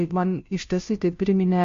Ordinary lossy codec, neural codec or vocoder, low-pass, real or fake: MP3, 48 kbps; codec, 16 kHz, 0.5 kbps, FunCodec, trained on Chinese and English, 25 frames a second; 7.2 kHz; fake